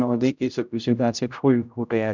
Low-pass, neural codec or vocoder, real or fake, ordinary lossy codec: 7.2 kHz; codec, 16 kHz, 0.5 kbps, X-Codec, HuBERT features, trained on general audio; fake; none